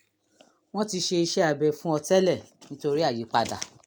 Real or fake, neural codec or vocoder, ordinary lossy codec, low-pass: real; none; none; none